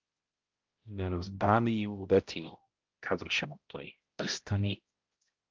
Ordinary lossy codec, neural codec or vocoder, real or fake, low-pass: Opus, 32 kbps; codec, 16 kHz, 0.5 kbps, X-Codec, HuBERT features, trained on balanced general audio; fake; 7.2 kHz